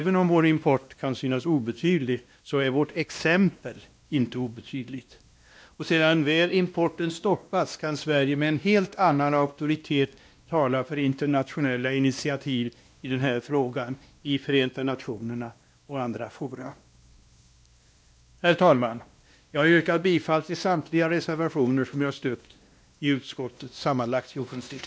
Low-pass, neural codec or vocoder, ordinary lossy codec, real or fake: none; codec, 16 kHz, 1 kbps, X-Codec, WavLM features, trained on Multilingual LibriSpeech; none; fake